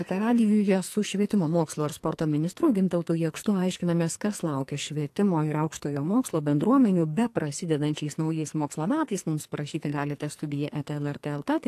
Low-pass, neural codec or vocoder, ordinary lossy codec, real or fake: 14.4 kHz; codec, 44.1 kHz, 2.6 kbps, SNAC; AAC, 64 kbps; fake